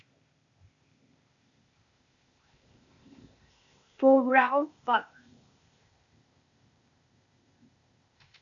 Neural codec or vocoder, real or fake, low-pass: codec, 16 kHz, 0.8 kbps, ZipCodec; fake; 7.2 kHz